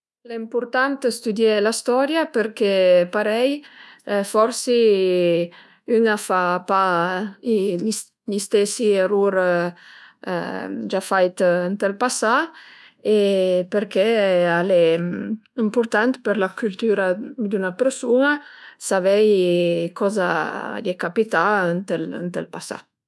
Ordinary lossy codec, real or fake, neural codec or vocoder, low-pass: none; fake; codec, 24 kHz, 1.2 kbps, DualCodec; none